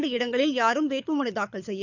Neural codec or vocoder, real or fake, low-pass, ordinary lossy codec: codec, 24 kHz, 6 kbps, HILCodec; fake; 7.2 kHz; none